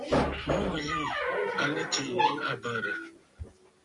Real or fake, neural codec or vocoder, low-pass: real; none; 10.8 kHz